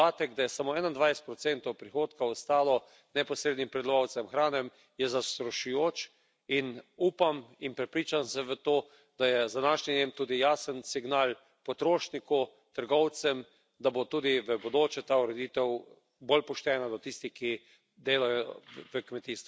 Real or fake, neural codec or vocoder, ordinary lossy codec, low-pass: real; none; none; none